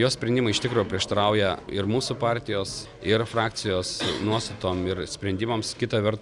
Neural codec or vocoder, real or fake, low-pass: none; real; 10.8 kHz